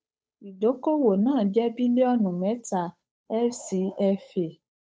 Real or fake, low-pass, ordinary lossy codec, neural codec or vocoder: fake; none; none; codec, 16 kHz, 8 kbps, FunCodec, trained on Chinese and English, 25 frames a second